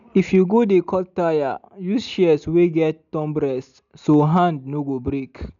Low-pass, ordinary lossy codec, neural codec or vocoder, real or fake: 7.2 kHz; none; none; real